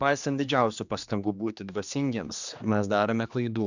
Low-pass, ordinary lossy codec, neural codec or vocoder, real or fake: 7.2 kHz; Opus, 64 kbps; codec, 16 kHz, 2 kbps, X-Codec, HuBERT features, trained on general audio; fake